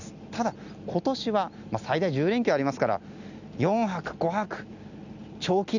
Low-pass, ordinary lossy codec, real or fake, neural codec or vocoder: 7.2 kHz; none; real; none